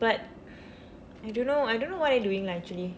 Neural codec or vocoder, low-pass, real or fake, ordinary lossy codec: none; none; real; none